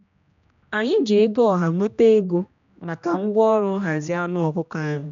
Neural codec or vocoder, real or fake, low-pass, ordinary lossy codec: codec, 16 kHz, 1 kbps, X-Codec, HuBERT features, trained on general audio; fake; 7.2 kHz; MP3, 96 kbps